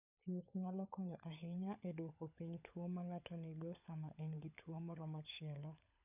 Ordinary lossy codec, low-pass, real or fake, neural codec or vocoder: none; 3.6 kHz; fake; codec, 16 kHz, 16 kbps, FunCodec, trained on LibriTTS, 50 frames a second